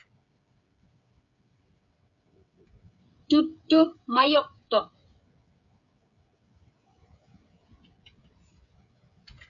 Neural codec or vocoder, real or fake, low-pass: codec, 16 kHz, 16 kbps, FreqCodec, smaller model; fake; 7.2 kHz